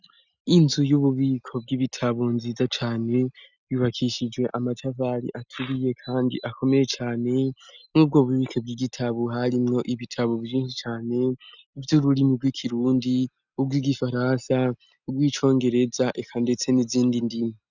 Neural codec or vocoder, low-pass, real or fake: none; 7.2 kHz; real